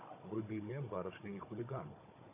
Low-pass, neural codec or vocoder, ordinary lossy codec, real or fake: 3.6 kHz; codec, 16 kHz, 16 kbps, FunCodec, trained on Chinese and English, 50 frames a second; MP3, 24 kbps; fake